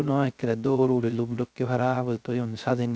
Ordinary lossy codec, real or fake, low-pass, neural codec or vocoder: none; fake; none; codec, 16 kHz, 0.3 kbps, FocalCodec